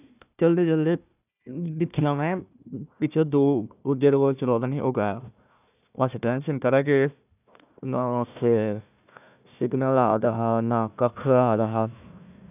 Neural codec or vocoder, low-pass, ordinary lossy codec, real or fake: codec, 16 kHz, 1 kbps, FunCodec, trained on Chinese and English, 50 frames a second; 3.6 kHz; none; fake